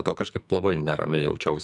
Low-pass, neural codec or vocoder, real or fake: 10.8 kHz; codec, 44.1 kHz, 2.6 kbps, SNAC; fake